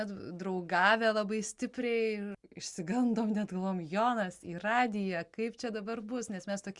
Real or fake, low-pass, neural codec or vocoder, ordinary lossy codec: real; 10.8 kHz; none; Opus, 64 kbps